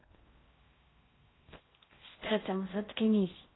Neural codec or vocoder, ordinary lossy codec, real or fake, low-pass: codec, 16 kHz in and 24 kHz out, 0.6 kbps, FocalCodec, streaming, 4096 codes; AAC, 16 kbps; fake; 7.2 kHz